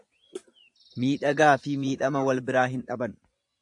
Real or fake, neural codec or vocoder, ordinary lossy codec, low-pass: fake; vocoder, 24 kHz, 100 mel bands, Vocos; AAC, 64 kbps; 10.8 kHz